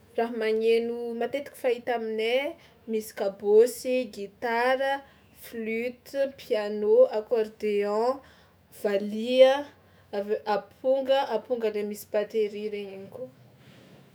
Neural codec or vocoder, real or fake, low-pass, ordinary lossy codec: autoencoder, 48 kHz, 128 numbers a frame, DAC-VAE, trained on Japanese speech; fake; none; none